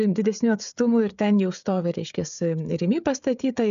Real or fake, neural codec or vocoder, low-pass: fake; codec, 16 kHz, 16 kbps, FreqCodec, smaller model; 7.2 kHz